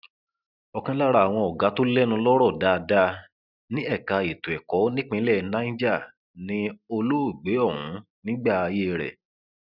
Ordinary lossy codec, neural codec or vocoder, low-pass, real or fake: none; none; 5.4 kHz; real